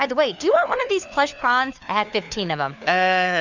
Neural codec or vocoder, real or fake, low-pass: codec, 16 kHz, 4 kbps, FunCodec, trained on LibriTTS, 50 frames a second; fake; 7.2 kHz